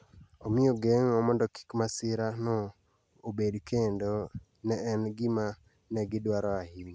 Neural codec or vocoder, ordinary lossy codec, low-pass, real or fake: none; none; none; real